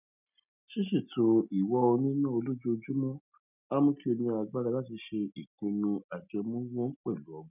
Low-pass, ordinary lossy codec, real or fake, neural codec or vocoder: 3.6 kHz; none; real; none